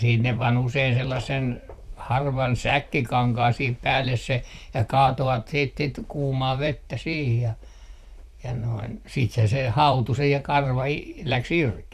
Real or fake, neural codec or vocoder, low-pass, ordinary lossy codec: fake; vocoder, 44.1 kHz, 128 mel bands, Pupu-Vocoder; 14.4 kHz; none